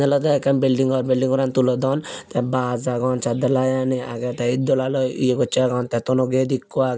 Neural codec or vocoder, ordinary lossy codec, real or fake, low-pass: none; none; real; none